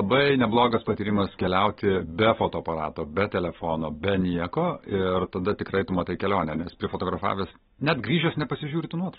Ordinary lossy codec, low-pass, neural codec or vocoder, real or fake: AAC, 16 kbps; 7.2 kHz; none; real